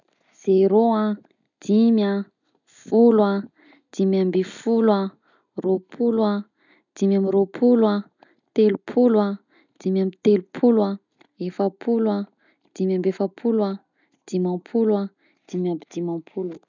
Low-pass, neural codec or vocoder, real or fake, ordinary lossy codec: 7.2 kHz; none; real; none